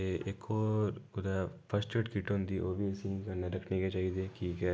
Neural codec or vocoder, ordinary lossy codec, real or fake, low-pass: none; none; real; none